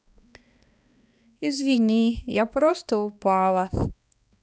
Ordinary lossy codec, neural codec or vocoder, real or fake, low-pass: none; codec, 16 kHz, 2 kbps, X-Codec, HuBERT features, trained on balanced general audio; fake; none